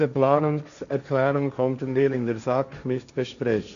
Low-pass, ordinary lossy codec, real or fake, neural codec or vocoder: 7.2 kHz; none; fake; codec, 16 kHz, 1.1 kbps, Voila-Tokenizer